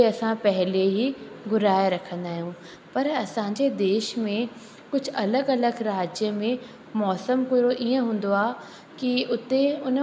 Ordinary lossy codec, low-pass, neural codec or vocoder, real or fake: none; none; none; real